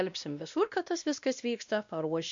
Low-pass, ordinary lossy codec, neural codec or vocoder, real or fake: 7.2 kHz; MP3, 64 kbps; codec, 16 kHz, 1 kbps, X-Codec, WavLM features, trained on Multilingual LibriSpeech; fake